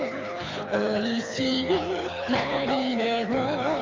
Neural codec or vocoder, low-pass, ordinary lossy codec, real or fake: codec, 24 kHz, 6 kbps, HILCodec; 7.2 kHz; AAC, 32 kbps; fake